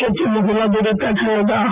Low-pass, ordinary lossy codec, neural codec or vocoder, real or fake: 3.6 kHz; Opus, 24 kbps; none; real